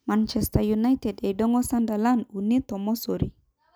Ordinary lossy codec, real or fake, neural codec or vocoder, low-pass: none; real; none; none